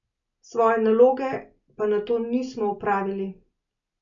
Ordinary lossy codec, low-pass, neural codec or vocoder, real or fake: none; 7.2 kHz; none; real